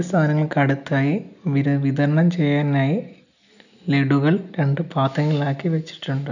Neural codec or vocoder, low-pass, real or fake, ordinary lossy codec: none; 7.2 kHz; real; none